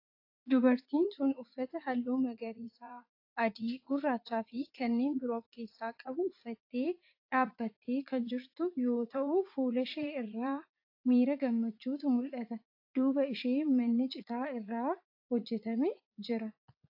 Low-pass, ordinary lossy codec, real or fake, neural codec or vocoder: 5.4 kHz; AAC, 32 kbps; fake; vocoder, 44.1 kHz, 80 mel bands, Vocos